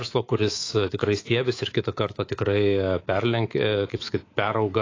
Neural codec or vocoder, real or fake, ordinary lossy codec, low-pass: codec, 24 kHz, 3.1 kbps, DualCodec; fake; AAC, 32 kbps; 7.2 kHz